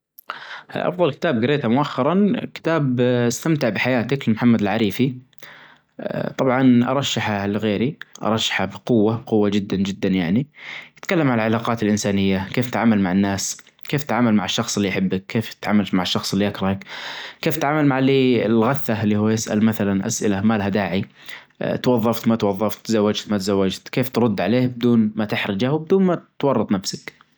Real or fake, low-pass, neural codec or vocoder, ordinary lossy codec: real; none; none; none